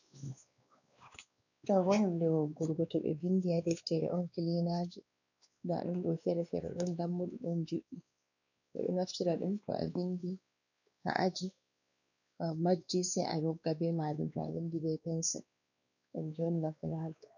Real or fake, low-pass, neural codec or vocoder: fake; 7.2 kHz; codec, 16 kHz, 2 kbps, X-Codec, WavLM features, trained on Multilingual LibriSpeech